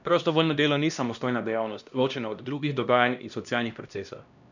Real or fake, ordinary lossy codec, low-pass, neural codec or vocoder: fake; none; 7.2 kHz; codec, 16 kHz, 1 kbps, X-Codec, HuBERT features, trained on LibriSpeech